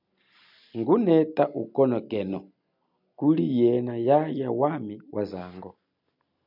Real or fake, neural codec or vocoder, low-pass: real; none; 5.4 kHz